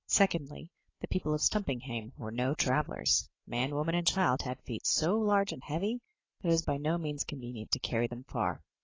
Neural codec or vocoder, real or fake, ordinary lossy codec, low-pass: none; real; AAC, 32 kbps; 7.2 kHz